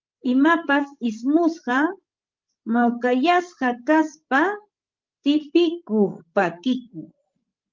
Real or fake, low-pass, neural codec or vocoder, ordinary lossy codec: fake; 7.2 kHz; codec, 16 kHz, 16 kbps, FreqCodec, larger model; Opus, 32 kbps